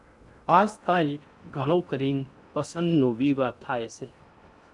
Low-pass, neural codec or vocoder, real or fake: 10.8 kHz; codec, 16 kHz in and 24 kHz out, 0.6 kbps, FocalCodec, streaming, 2048 codes; fake